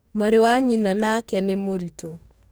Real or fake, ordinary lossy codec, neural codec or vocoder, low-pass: fake; none; codec, 44.1 kHz, 2.6 kbps, DAC; none